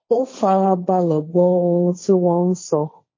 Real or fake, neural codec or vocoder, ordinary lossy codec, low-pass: fake; codec, 16 kHz, 1.1 kbps, Voila-Tokenizer; MP3, 32 kbps; 7.2 kHz